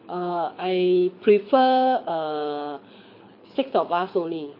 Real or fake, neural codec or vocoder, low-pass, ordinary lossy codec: fake; codec, 24 kHz, 6 kbps, HILCodec; 5.4 kHz; MP3, 32 kbps